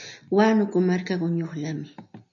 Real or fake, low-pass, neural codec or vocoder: real; 7.2 kHz; none